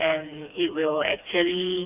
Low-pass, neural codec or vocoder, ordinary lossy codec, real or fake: 3.6 kHz; codec, 16 kHz, 2 kbps, FreqCodec, smaller model; none; fake